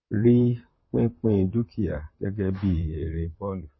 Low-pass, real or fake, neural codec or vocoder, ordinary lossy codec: 7.2 kHz; fake; vocoder, 44.1 kHz, 128 mel bands, Pupu-Vocoder; MP3, 24 kbps